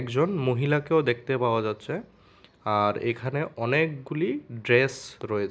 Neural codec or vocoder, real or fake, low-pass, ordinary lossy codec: none; real; none; none